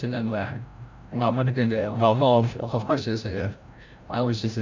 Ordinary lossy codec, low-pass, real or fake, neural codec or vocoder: MP3, 64 kbps; 7.2 kHz; fake; codec, 16 kHz, 0.5 kbps, FreqCodec, larger model